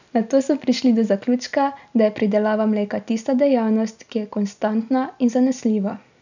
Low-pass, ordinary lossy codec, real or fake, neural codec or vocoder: 7.2 kHz; none; real; none